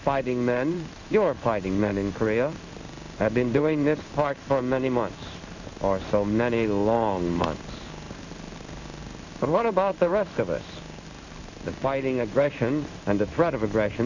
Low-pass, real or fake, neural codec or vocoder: 7.2 kHz; fake; codec, 16 kHz in and 24 kHz out, 1 kbps, XY-Tokenizer